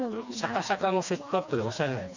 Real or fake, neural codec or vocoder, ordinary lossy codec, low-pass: fake; codec, 16 kHz, 2 kbps, FreqCodec, smaller model; none; 7.2 kHz